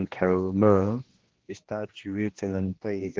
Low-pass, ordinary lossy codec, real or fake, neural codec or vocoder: 7.2 kHz; Opus, 16 kbps; fake; codec, 16 kHz, 1 kbps, X-Codec, HuBERT features, trained on general audio